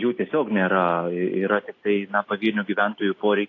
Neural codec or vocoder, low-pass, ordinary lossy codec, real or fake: none; 7.2 kHz; AAC, 32 kbps; real